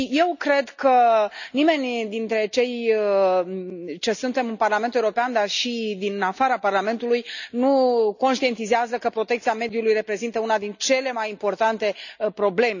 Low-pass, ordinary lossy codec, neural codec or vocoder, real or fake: 7.2 kHz; none; none; real